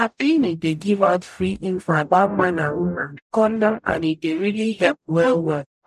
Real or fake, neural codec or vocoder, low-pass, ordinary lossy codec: fake; codec, 44.1 kHz, 0.9 kbps, DAC; 14.4 kHz; none